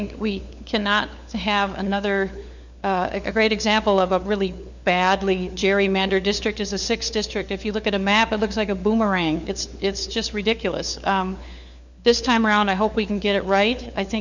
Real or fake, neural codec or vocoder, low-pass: fake; codec, 16 kHz, 4 kbps, FunCodec, trained on LibriTTS, 50 frames a second; 7.2 kHz